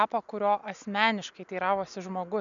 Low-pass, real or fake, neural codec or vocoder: 7.2 kHz; real; none